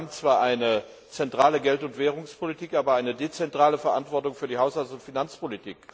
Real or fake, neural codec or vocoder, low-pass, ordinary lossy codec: real; none; none; none